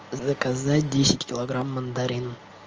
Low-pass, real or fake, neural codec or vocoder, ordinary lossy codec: 7.2 kHz; real; none; Opus, 24 kbps